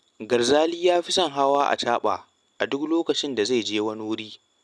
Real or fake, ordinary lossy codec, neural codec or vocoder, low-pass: real; none; none; none